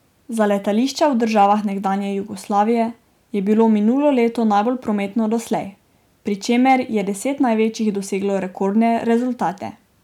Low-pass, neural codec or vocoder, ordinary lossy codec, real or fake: 19.8 kHz; none; none; real